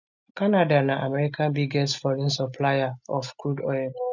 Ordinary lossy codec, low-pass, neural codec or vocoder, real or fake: none; 7.2 kHz; none; real